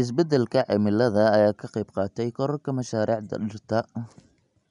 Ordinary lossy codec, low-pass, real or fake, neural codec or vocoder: none; 10.8 kHz; real; none